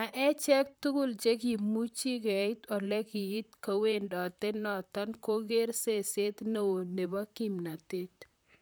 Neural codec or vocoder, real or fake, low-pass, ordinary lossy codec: vocoder, 44.1 kHz, 128 mel bands, Pupu-Vocoder; fake; none; none